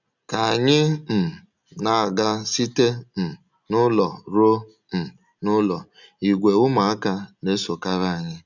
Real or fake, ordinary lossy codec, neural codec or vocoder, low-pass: real; none; none; 7.2 kHz